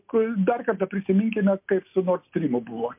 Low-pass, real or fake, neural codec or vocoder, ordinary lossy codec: 3.6 kHz; real; none; MP3, 32 kbps